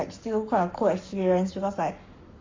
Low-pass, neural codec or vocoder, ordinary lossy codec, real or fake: 7.2 kHz; codec, 44.1 kHz, 7.8 kbps, Pupu-Codec; MP3, 48 kbps; fake